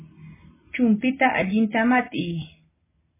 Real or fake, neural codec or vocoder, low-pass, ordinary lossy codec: real; none; 3.6 kHz; MP3, 16 kbps